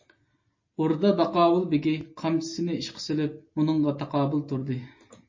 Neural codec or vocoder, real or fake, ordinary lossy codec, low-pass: none; real; MP3, 32 kbps; 7.2 kHz